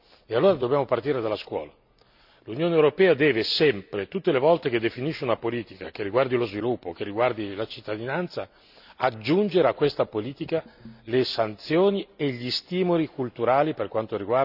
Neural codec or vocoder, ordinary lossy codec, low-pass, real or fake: none; none; 5.4 kHz; real